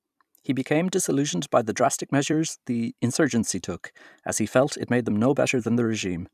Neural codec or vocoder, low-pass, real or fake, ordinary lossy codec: vocoder, 44.1 kHz, 128 mel bands every 256 samples, BigVGAN v2; 14.4 kHz; fake; none